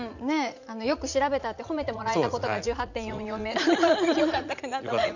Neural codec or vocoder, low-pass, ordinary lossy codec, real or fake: vocoder, 44.1 kHz, 80 mel bands, Vocos; 7.2 kHz; none; fake